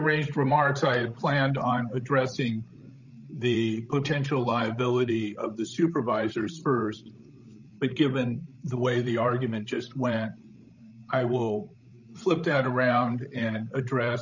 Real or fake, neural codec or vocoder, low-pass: fake; codec, 16 kHz, 16 kbps, FreqCodec, larger model; 7.2 kHz